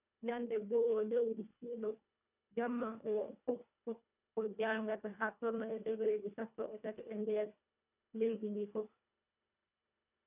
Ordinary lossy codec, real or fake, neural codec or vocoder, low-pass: none; fake; codec, 24 kHz, 1.5 kbps, HILCodec; 3.6 kHz